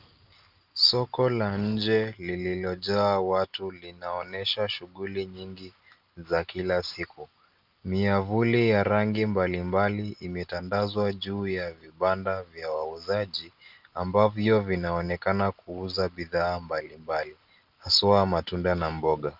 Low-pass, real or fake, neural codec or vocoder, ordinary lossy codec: 5.4 kHz; real; none; Opus, 32 kbps